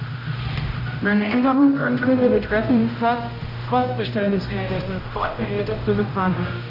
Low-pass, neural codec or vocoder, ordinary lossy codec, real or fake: 5.4 kHz; codec, 16 kHz, 0.5 kbps, X-Codec, HuBERT features, trained on general audio; none; fake